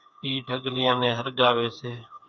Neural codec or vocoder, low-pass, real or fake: codec, 16 kHz, 4 kbps, FreqCodec, smaller model; 7.2 kHz; fake